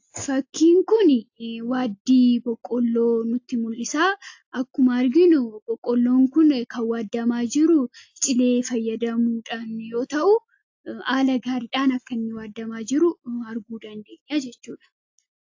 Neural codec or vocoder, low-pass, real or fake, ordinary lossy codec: none; 7.2 kHz; real; AAC, 48 kbps